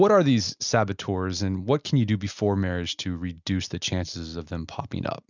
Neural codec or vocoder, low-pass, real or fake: none; 7.2 kHz; real